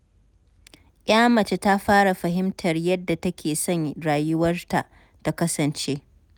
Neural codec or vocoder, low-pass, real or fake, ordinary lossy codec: none; none; real; none